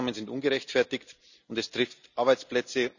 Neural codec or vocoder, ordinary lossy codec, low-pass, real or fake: none; none; 7.2 kHz; real